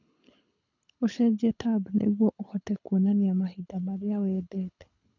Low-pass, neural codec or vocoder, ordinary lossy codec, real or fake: 7.2 kHz; codec, 24 kHz, 6 kbps, HILCodec; none; fake